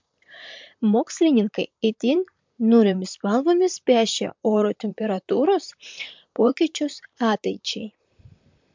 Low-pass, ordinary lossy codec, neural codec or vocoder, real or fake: 7.2 kHz; MP3, 64 kbps; vocoder, 44.1 kHz, 128 mel bands, Pupu-Vocoder; fake